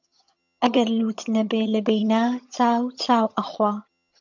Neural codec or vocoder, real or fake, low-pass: vocoder, 22.05 kHz, 80 mel bands, HiFi-GAN; fake; 7.2 kHz